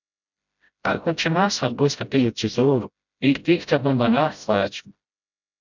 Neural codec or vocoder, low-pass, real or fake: codec, 16 kHz, 0.5 kbps, FreqCodec, smaller model; 7.2 kHz; fake